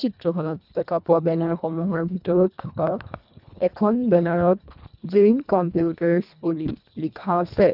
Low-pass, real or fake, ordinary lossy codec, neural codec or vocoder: 5.4 kHz; fake; none; codec, 24 kHz, 1.5 kbps, HILCodec